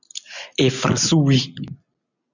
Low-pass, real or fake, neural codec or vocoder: 7.2 kHz; real; none